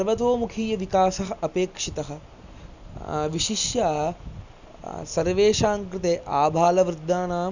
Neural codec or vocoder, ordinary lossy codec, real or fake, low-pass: none; none; real; 7.2 kHz